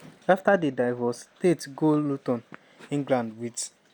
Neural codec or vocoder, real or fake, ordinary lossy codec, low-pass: none; real; none; 19.8 kHz